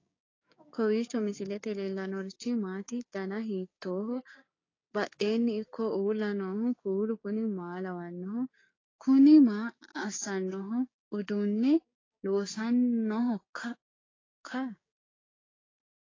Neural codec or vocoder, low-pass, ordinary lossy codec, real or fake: codec, 16 kHz, 6 kbps, DAC; 7.2 kHz; AAC, 32 kbps; fake